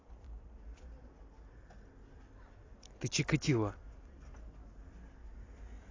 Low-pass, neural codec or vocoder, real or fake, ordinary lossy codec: 7.2 kHz; none; real; AAC, 32 kbps